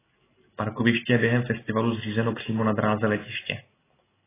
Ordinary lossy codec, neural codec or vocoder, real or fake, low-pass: AAC, 16 kbps; none; real; 3.6 kHz